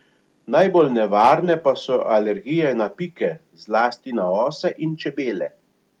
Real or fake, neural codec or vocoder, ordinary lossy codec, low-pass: real; none; Opus, 32 kbps; 19.8 kHz